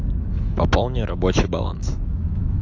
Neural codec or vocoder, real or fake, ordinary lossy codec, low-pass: autoencoder, 48 kHz, 128 numbers a frame, DAC-VAE, trained on Japanese speech; fake; AAC, 48 kbps; 7.2 kHz